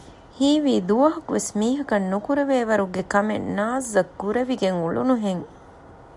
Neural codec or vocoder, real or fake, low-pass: none; real; 10.8 kHz